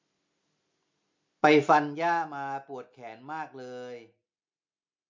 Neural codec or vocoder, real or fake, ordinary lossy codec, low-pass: none; real; MP3, 48 kbps; 7.2 kHz